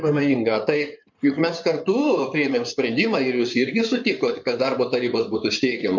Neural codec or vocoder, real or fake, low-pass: codec, 16 kHz in and 24 kHz out, 2.2 kbps, FireRedTTS-2 codec; fake; 7.2 kHz